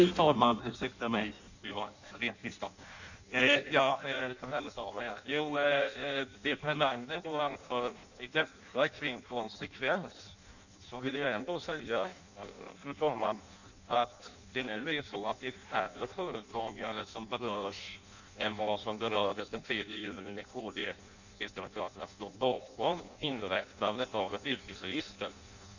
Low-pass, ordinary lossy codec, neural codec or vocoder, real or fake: 7.2 kHz; none; codec, 16 kHz in and 24 kHz out, 0.6 kbps, FireRedTTS-2 codec; fake